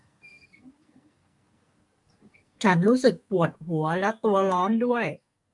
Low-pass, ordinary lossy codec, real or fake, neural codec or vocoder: 10.8 kHz; MP3, 64 kbps; fake; codec, 44.1 kHz, 2.6 kbps, SNAC